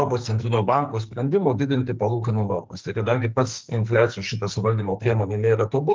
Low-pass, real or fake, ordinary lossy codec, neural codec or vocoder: 7.2 kHz; fake; Opus, 32 kbps; codec, 44.1 kHz, 2.6 kbps, SNAC